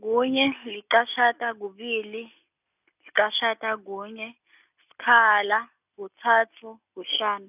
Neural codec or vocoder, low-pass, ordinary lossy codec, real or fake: none; 3.6 kHz; none; real